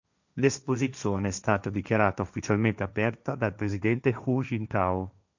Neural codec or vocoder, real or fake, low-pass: codec, 16 kHz, 1.1 kbps, Voila-Tokenizer; fake; 7.2 kHz